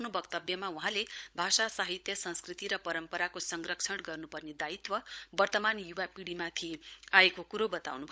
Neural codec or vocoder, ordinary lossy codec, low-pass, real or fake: codec, 16 kHz, 16 kbps, FunCodec, trained on LibriTTS, 50 frames a second; none; none; fake